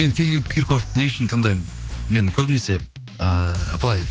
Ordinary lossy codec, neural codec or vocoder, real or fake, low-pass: none; codec, 16 kHz, 2 kbps, X-Codec, HuBERT features, trained on general audio; fake; none